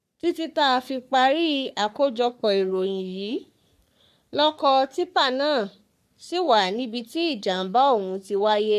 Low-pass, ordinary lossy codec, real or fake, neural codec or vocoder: 14.4 kHz; none; fake; codec, 44.1 kHz, 3.4 kbps, Pupu-Codec